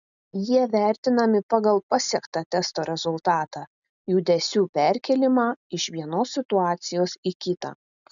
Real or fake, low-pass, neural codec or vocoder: real; 7.2 kHz; none